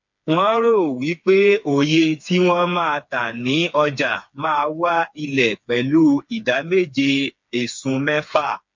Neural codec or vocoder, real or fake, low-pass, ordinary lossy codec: codec, 16 kHz, 4 kbps, FreqCodec, smaller model; fake; 7.2 kHz; MP3, 48 kbps